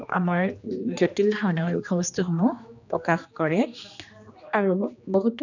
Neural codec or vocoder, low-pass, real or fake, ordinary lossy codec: codec, 16 kHz, 2 kbps, X-Codec, HuBERT features, trained on general audio; 7.2 kHz; fake; none